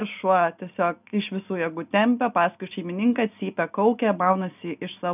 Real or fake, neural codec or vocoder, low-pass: real; none; 3.6 kHz